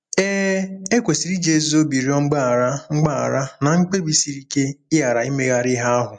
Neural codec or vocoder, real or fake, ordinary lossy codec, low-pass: none; real; MP3, 64 kbps; 9.9 kHz